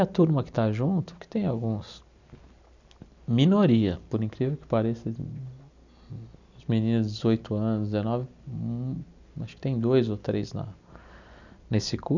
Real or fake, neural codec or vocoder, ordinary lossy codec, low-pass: real; none; none; 7.2 kHz